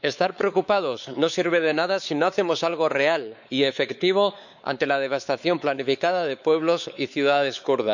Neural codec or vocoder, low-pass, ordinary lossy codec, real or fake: codec, 16 kHz, 4 kbps, X-Codec, WavLM features, trained on Multilingual LibriSpeech; 7.2 kHz; none; fake